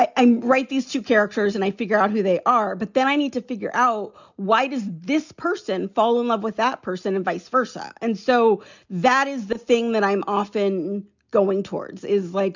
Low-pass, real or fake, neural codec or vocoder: 7.2 kHz; real; none